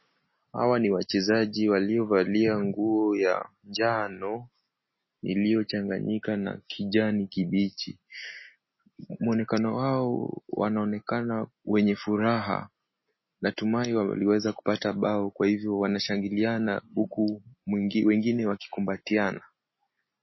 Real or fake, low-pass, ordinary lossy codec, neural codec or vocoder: real; 7.2 kHz; MP3, 24 kbps; none